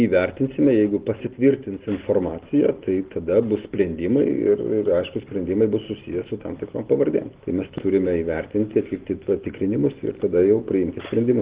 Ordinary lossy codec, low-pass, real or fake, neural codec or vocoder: Opus, 16 kbps; 3.6 kHz; real; none